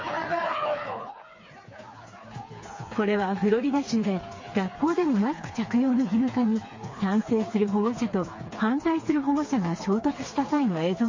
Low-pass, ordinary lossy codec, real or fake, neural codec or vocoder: 7.2 kHz; MP3, 32 kbps; fake; codec, 16 kHz, 4 kbps, FreqCodec, smaller model